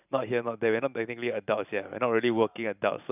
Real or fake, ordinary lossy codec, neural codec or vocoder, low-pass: fake; none; vocoder, 44.1 kHz, 128 mel bands every 512 samples, BigVGAN v2; 3.6 kHz